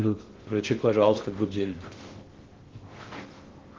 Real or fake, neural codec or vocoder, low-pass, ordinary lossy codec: fake; codec, 16 kHz in and 24 kHz out, 0.6 kbps, FocalCodec, streaming, 2048 codes; 7.2 kHz; Opus, 16 kbps